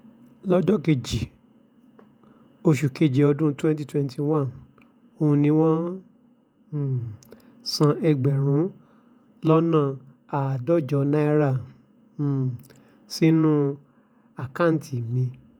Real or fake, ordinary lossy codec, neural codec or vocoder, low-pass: fake; none; vocoder, 48 kHz, 128 mel bands, Vocos; 19.8 kHz